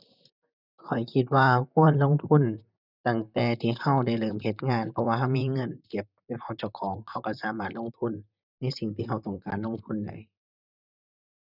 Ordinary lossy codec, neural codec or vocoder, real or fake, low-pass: none; vocoder, 44.1 kHz, 128 mel bands, Pupu-Vocoder; fake; 5.4 kHz